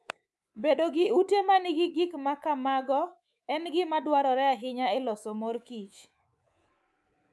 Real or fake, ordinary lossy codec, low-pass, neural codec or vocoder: fake; none; none; codec, 24 kHz, 3.1 kbps, DualCodec